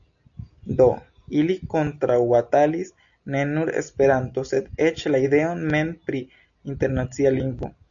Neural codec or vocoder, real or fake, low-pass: none; real; 7.2 kHz